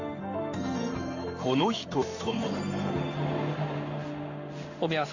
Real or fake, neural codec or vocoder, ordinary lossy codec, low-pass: fake; codec, 16 kHz in and 24 kHz out, 1 kbps, XY-Tokenizer; none; 7.2 kHz